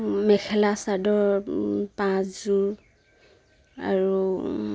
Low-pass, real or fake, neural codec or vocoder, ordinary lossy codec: none; real; none; none